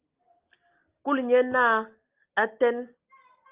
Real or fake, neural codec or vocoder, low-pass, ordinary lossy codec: real; none; 3.6 kHz; Opus, 32 kbps